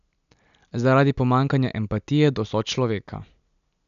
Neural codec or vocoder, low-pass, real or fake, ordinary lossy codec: none; 7.2 kHz; real; none